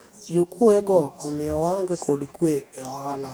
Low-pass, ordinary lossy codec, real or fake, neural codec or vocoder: none; none; fake; codec, 44.1 kHz, 2.6 kbps, DAC